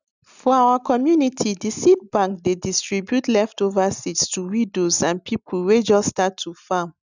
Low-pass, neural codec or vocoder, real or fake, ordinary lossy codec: 7.2 kHz; none; real; none